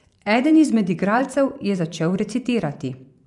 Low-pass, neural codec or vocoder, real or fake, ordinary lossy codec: 10.8 kHz; vocoder, 24 kHz, 100 mel bands, Vocos; fake; none